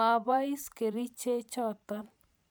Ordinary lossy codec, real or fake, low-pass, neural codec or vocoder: none; fake; none; vocoder, 44.1 kHz, 128 mel bands every 512 samples, BigVGAN v2